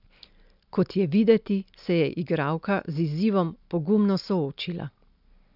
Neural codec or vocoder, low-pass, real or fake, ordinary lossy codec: none; 5.4 kHz; real; none